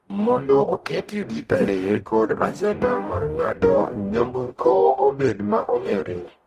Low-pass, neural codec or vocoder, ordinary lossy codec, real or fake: 14.4 kHz; codec, 44.1 kHz, 0.9 kbps, DAC; Opus, 32 kbps; fake